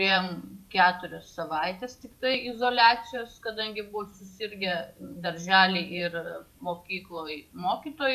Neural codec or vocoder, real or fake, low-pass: vocoder, 44.1 kHz, 128 mel bands every 512 samples, BigVGAN v2; fake; 14.4 kHz